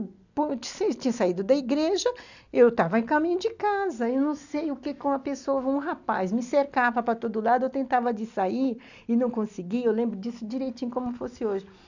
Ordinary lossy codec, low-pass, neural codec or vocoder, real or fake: none; 7.2 kHz; none; real